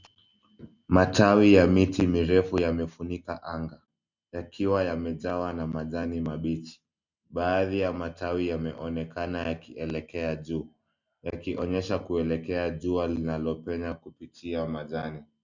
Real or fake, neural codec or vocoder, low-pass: real; none; 7.2 kHz